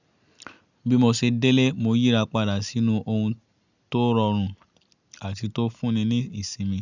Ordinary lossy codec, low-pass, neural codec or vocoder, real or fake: none; 7.2 kHz; none; real